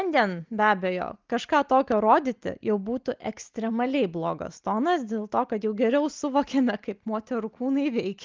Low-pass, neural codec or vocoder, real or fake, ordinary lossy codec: 7.2 kHz; none; real; Opus, 32 kbps